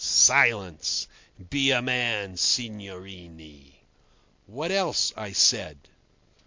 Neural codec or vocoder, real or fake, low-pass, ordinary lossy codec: none; real; 7.2 kHz; MP3, 48 kbps